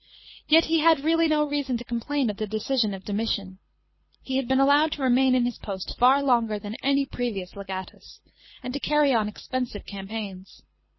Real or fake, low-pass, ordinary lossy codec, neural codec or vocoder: fake; 7.2 kHz; MP3, 24 kbps; codec, 24 kHz, 6 kbps, HILCodec